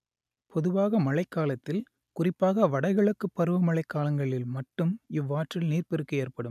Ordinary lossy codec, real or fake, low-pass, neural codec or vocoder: none; real; 14.4 kHz; none